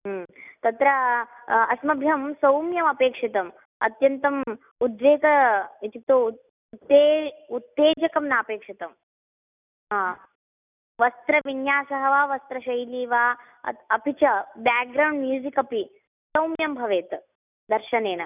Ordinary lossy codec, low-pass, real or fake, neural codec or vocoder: none; 3.6 kHz; real; none